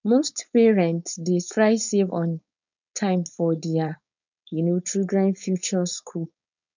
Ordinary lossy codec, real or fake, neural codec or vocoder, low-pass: none; fake; codec, 16 kHz, 4.8 kbps, FACodec; 7.2 kHz